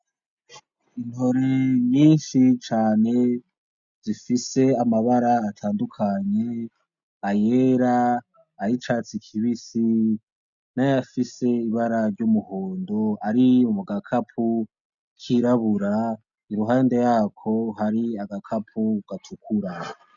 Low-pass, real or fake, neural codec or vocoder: 7.2 kHz; real; none